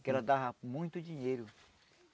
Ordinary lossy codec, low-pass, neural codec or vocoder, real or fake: none; none; none; real